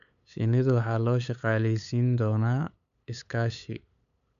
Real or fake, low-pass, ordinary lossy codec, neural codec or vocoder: fake; 7.2 kHz; none; codec, 16 kHz, 8 kbps, FunCodec, trained on LibriTTS, 25 frames a second